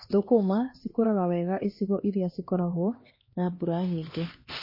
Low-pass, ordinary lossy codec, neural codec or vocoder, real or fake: 5.4 kHz; MP3, 24 kbps; codec, 16 kHz, 2 kbps, X-Codec, HuBERT features, trained on LibriSpeech; fake